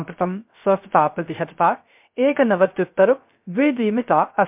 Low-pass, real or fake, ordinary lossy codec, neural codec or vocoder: 3.6 kHz; fake; MP3, 32 kbps; codec, 16 kHz, 0.3 kbps, FocalCodec